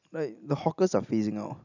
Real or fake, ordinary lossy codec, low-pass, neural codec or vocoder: real; none; 7.2 kHz; none